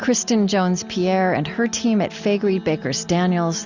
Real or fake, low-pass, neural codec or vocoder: real; 7.2 kHz; none